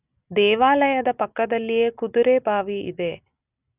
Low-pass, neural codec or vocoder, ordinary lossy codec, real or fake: 3.6 kHz; none; none; real